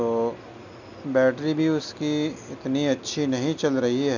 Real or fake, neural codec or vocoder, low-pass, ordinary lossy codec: real; none; 7.2 kHz; none